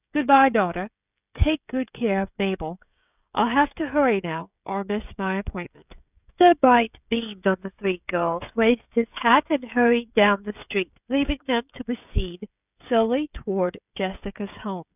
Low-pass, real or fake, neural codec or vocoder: 3.6 kHz; fake; codec, 16 kHz, 16 kbps, FreqCodec, smaller model